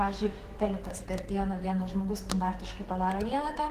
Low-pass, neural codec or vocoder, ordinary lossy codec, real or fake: 14.4 kHz; codec, 32 kHz, 1.9 kbps, SNAC; Opus, 16 kbps; fake